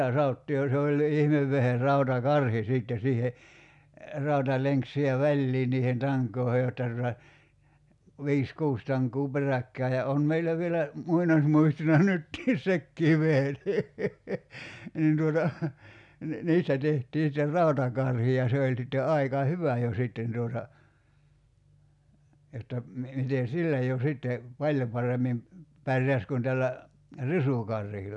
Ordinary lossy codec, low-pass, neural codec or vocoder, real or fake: none; 10.8 kHz; none; real